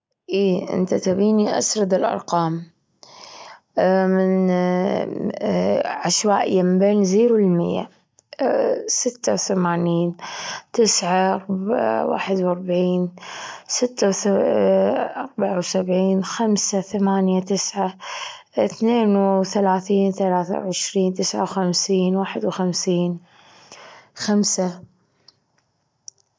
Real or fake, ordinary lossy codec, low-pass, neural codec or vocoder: real; none; none; none